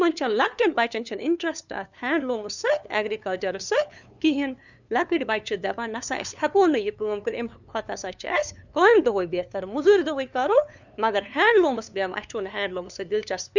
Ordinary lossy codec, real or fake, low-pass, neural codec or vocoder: none; fake; 7.2 kHz; codec, 16 kHz, 2 kbps, FunCodec, trained on LibriTTS, 25 frames a second